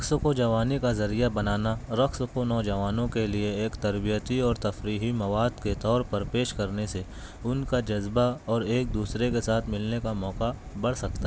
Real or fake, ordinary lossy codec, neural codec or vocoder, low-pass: real; none; none; none